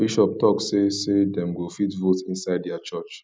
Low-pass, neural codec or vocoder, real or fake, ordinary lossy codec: none; none; real; none